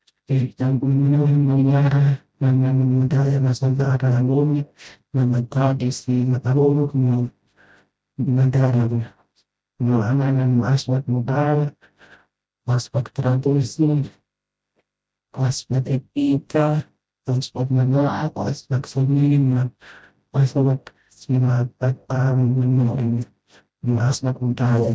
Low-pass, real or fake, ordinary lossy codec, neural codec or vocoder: none; fake; none; codec, 16 kHz, 0.5 kbps, FreqCodec, smaller model